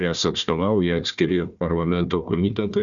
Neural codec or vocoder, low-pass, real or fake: codec, 16 kHz, 1 kbps, FunCodec, trained on Chinese and English, 50 frames a second; 7.2 kHz; fake